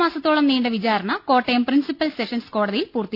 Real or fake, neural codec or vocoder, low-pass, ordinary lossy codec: real; none; 5.4 kHz; AAC, 48 kbps